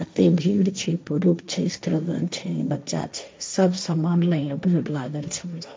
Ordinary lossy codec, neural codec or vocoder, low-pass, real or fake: none; codec, 16 kHz, 1.1 kbps, Voila-Tokenizer; none; fake